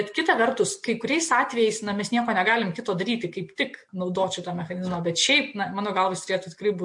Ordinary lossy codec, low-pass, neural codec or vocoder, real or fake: MP3, 48 kbps; 10.8 kHz; none; real